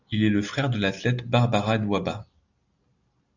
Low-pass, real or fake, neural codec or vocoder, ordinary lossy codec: 7.2 kHz; real; none; Opus, 64 kbps